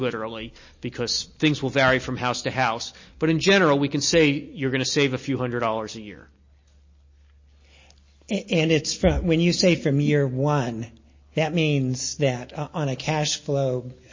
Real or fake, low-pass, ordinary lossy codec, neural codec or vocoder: real; 7.2 kHz; MP3, 32 kbps; none